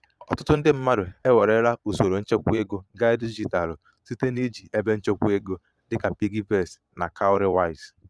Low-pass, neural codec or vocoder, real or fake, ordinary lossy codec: none; vocoder, 22.05 kHz, 80 mel bands, Vocos; fake; none